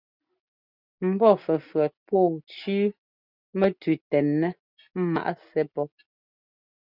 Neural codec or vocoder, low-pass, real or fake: none; 5.4 kHz; real